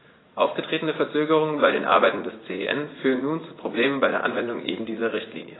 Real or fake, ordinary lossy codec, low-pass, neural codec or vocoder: fake; AAC, 16 kbps; 7.2 kHz; vocoder, 44.1 kHz, 80 mel bands, Vocos